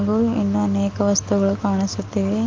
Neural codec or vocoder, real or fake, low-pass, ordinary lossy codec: none; real; 7.2 kHz; Opus, 24 kbps